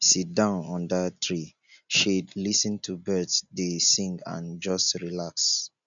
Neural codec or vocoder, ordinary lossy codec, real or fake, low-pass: none; none; real; 7.2 kHz